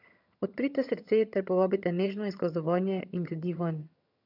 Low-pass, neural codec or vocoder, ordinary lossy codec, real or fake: 5.4 kHz; vocoder, 22.05 kHz, 80 mel bands, HiFi-GAN; none; fake